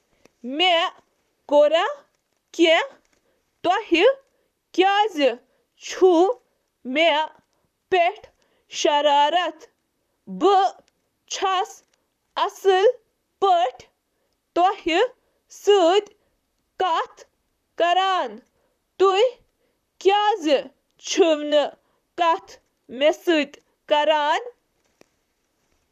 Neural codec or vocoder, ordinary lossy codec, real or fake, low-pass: vocoder, 44.1 kHz, 128 mel bands, Pupu-Vocoder; none; fake; 14.4 kHz